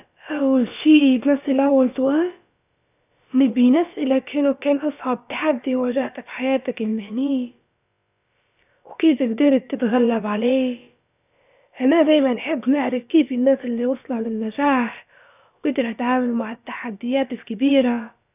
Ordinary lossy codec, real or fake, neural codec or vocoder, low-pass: none; fake; codec, 16 kHz, about 1 kbps, DyCAST, with the encoder's durations; 3.6 kHz